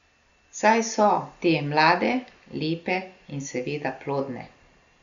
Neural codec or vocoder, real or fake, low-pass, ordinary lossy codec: none; real; 7.2 kHz; Opus, 64 kbps